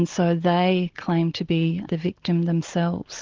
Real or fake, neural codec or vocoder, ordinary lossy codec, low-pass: real; none; Opus, 16 kbps; 7.2 kHz